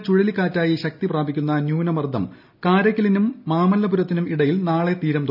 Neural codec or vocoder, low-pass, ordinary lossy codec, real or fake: none; 5.4 kHz; none; real